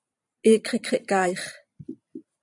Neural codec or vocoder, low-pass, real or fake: vocoder, 44.1 kHz, 128 mel bands every 256 samples, BigVGAN v2; 10.8 kHz; fake